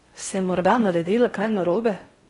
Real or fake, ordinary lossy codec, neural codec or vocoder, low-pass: fake; AAC, 32 kbps; codec, 16 kHz in and 24 kHz out, 0.6 kbps, FocalCodec, streaming, 4096 codes; 10.8 kHz